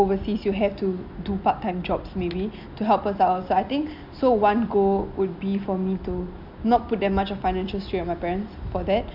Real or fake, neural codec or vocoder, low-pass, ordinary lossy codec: real; none; 5.4 kHz; none